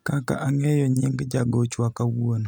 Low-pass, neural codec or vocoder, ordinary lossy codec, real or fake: none; none; none; real